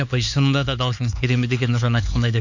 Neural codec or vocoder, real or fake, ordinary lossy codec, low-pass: codec, 16 kHz, 4 kbps, X-Codec, HuBERT features, trained on LibriSpeech; fake; none; 7.2 kHz